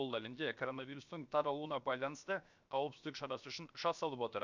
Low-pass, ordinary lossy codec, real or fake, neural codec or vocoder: 7.2 kHz; none; fake; codec, 16 kHz, 0.7 kbps, FocalCodec